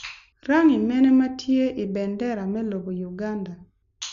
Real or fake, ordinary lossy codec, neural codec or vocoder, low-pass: real; none; none; 7.2 kHz